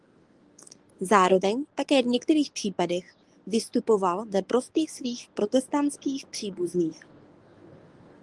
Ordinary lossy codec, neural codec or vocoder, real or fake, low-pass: Opus, 24 kbps; codec, 24 kHz, 0.9 kbps, WavTokenizer, medium speech release version 1; fake; 10.8 kHz